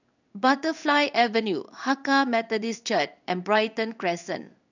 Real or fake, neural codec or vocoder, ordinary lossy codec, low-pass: fake; codec, 16 kHz in and 24 kHz out, 1 kbps, XY-Tokenizer; none; 7.2 kHz